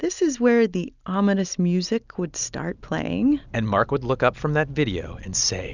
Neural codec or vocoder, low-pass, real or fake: none; 7.2 kHz; real